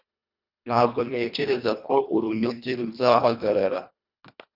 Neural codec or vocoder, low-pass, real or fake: codec, 24 kHz, 1.5 kbps, HILCodec; 5.4 kHz; fake